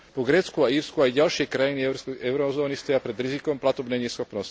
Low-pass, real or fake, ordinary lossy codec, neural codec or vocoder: none; real; none; none